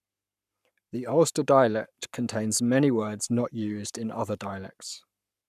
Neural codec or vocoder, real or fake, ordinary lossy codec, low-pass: codec, 44.1 kHz, 7.8 kbps, Pupu-Codec; fake; none; 14.4 kHz